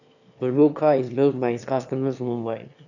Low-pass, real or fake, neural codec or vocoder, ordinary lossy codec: 7.2 kHz; fake; autoencoder, 22.05 kHz, a latent of 192 numbers a frame, VITS, trained on one speaker; none